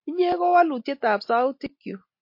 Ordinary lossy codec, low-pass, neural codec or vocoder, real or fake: MP3, 32 kbps; 5.4 kHz; none; real